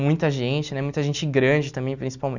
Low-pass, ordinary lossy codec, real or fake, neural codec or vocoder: 7.2 kHz; none; real; none